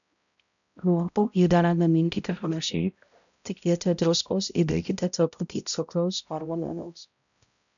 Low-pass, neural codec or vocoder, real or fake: 7.2 kHz; codec, 16 kHz, 0.5 kbps, X-Codec, HuBERT features, trained on balanced general audio; fake